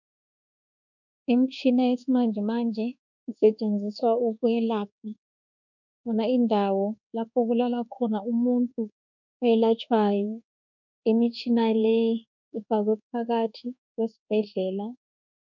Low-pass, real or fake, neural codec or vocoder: 7.2 kHz; fake; codec, 24 kHz, 1.2 kbps, DualCodec